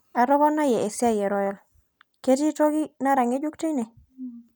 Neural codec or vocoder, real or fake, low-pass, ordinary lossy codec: none; real; none; none